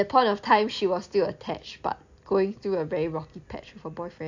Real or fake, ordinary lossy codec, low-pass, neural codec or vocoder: real; AAC, 48 kbps; 7.2 kHz; none